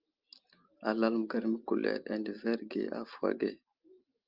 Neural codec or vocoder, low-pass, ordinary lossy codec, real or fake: none; 5.4 kHz; Opus, 24 kbps; real